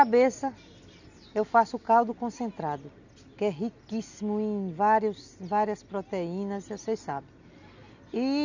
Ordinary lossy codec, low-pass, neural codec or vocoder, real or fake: none; 7.2 kHz; none; real